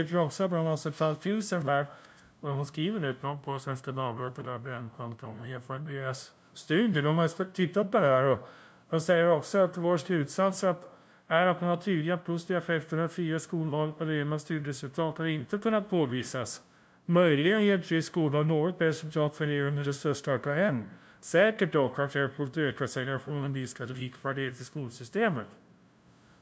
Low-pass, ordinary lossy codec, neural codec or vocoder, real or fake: none; none; codec, 16 kHz, 0.5 kbps, FunCodec, trained on LibriTTS, 25 frames a second; fake